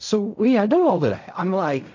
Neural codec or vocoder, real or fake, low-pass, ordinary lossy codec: codec, 16 kHz in and 24 kHz out, 0.4 kbps, LongCat-Audio-Codec, fine tuned four codebook decoder; fake; 7.2 kHz; MP3, 48 kbps